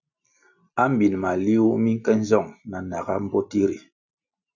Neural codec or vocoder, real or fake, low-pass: none; real; 7.2 kHz